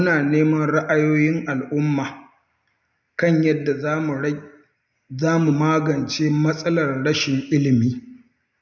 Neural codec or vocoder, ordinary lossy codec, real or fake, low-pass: none; none; real; 7.2 kHz